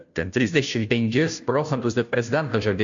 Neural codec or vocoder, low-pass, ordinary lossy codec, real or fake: codec, 16 kHz, 0.5 kbps, FunCodec, trained on Chinese and English, 25 frames a second; 7.2 kHz; AAC, 64 kbps; fake